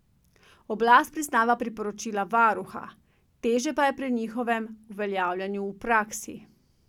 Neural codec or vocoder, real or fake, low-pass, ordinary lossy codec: none; real; 19.8 kHz; none